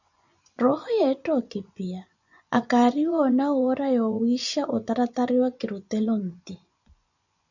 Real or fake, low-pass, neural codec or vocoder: fake; 7.2 kHz; vocoder, 24 kHz, 100 mel bands, Vocos